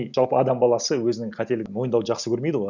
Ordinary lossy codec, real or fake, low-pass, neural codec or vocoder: none; real; 7.2 kHz; none